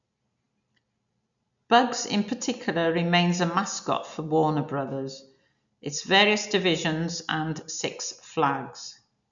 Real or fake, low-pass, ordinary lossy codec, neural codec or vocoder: real; 7.2 kHz; none; none